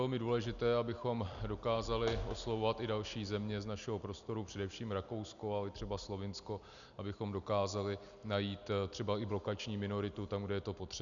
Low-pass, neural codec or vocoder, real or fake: 7.2 kHz; none; real